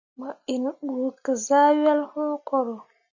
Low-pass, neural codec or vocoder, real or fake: 7.2 kHz; none; real